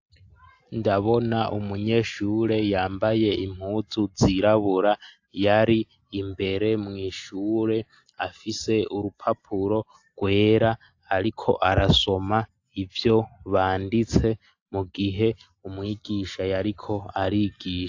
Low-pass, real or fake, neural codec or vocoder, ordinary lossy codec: 7.2 kHz; real; none; AAC, 48 kbps